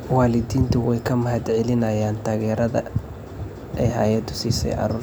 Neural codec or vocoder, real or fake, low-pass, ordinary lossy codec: none; real; none; none